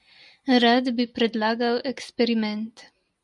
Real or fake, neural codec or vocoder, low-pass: real; none; 10.8 kHz